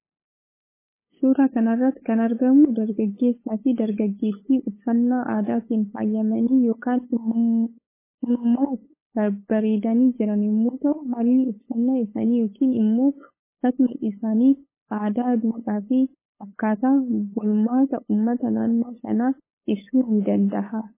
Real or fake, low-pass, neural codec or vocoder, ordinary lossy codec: fake; 3.6 kHz; codec, 16 kHz, 8 kbps, FunCodec, trained on LibriTTS, 25 frames a second; MP3, 16 kbps